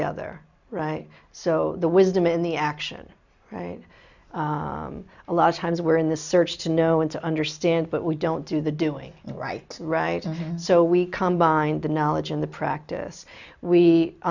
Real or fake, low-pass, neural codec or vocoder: real; 7.2 kHz; none